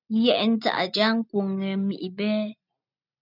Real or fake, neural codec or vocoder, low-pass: real; none; 5.4 kHz